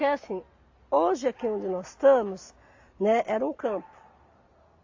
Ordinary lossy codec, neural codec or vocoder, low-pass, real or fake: AAC, 48 kbps; none; 7.2 kHz; real